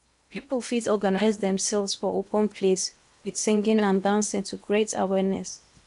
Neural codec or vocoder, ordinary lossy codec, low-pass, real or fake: codec, 16 kHz in and 24 kHz out, 0.8 kbps, FocalCodec, streaming, 65536 codes; none; 10.8 kHz; fake